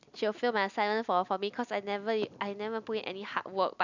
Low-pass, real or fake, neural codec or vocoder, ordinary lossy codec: 7.2 kHz; real; none; none